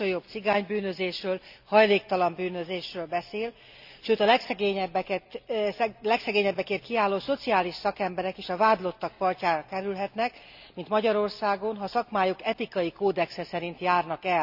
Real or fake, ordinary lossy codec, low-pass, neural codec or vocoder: real; none; 5.4 kHz; none